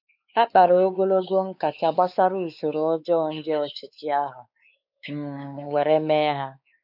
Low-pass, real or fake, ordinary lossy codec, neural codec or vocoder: 5.4 kHz; fake; none; codec, 16 kHz, 4 kbps, X-Codec, WavLM features, trained on Multilingual LibriSpeech